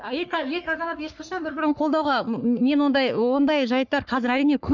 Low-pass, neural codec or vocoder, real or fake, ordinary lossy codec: 7.2 kHz; codec, 44.1 kHz, 3.4 kbps, Pupu-Codec; fake; none